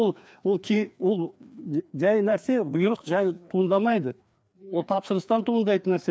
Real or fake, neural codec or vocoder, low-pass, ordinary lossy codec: fake; codec, 16 kHz, 2 kbps, FreqCodec, larger model; none; none